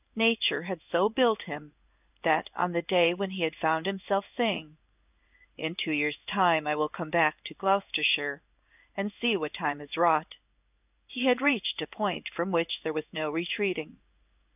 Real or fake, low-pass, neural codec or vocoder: fake; 3.6 kHz; codec, 16 kHz in and 24 kHz out, 1 kbps, XY-Tokenizer